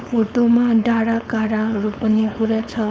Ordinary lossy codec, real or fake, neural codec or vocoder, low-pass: none; fake; codec, 16 kHz, 4.8 kbps, FACodec; none